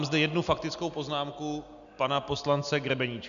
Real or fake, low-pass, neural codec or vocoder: real; 7.2 kHz; none